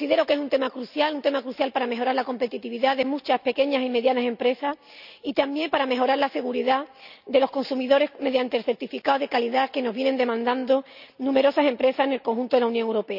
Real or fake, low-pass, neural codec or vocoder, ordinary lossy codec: real; 5.4 kHz; none; none